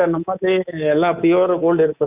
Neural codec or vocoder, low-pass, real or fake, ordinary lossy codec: codec, 24 kHz, 3.1 kbps, DualCodec; 3.6 kHz; fake; Opus, 64 kbps